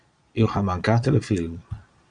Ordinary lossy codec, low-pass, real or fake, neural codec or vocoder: MP3, 96 kbps; 9.9 kHz; fake; vocoder, 22.05 kHz, 80 mel bands, Vocos